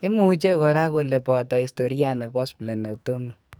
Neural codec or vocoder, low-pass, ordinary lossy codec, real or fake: codec, 44.1 kHz, 2.6 kbps, SNAC; none; none; fake